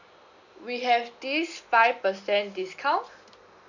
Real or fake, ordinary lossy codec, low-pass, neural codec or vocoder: real; none; 7.2 kHz; none